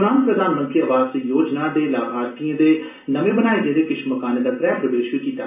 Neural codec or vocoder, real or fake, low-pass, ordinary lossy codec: none; real; 3.6 kHz; AAC, 32 kbps